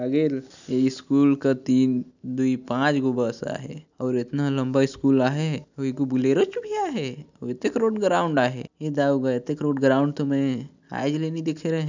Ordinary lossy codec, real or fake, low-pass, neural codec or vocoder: none; real; 7.2 kHz; none